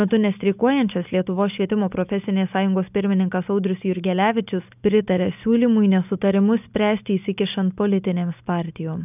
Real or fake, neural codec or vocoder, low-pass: real; none; 3.6 kHz